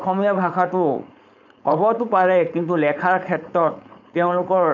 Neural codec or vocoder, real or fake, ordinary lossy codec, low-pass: codec, 16 kHz, 4.8 kbps, FACodec; fake; none; 7.2 kHz